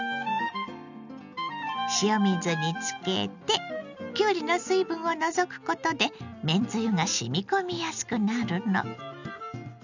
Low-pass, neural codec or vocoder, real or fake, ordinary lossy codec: 7.2 kHz; none; real; none